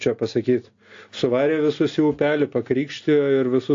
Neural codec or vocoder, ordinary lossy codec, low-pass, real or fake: none; AAC, 48 kbps; 7.2 kHz; real